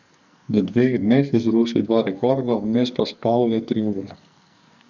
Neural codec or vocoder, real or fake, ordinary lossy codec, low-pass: codec, 44.1 kHz, 2.6 kbps, SNAC; fake; none; 7.2 kHz